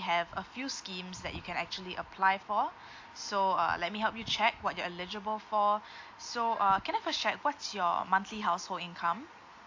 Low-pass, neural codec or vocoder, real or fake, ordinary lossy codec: 7.2 kHz; none; real; AAC, 48 kbps